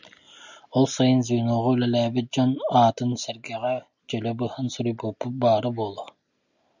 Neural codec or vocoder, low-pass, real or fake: none; 7.2 kHz; real